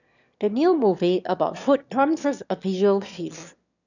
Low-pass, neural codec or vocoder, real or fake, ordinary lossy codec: 7.2 kHz; autoencoder, 22.05 kHz, a latent of 192 numbers a frame, VITS, trained on one speaker; fake; none